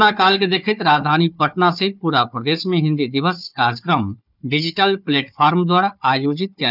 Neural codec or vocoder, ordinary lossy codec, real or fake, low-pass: codec, 16 kHz, 4 kbps, FunCodec, trained on Chinese and English, 50 frames a second; none; fake; 5.4 kHz